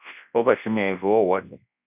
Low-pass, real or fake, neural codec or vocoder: 3.6 kHz; fake; codec, 24 kHz, 0.9 kbps, WavTokenizer, large speech release